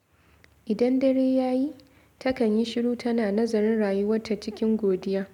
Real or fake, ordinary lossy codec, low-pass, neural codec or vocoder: real; none; 19.8 kHz; none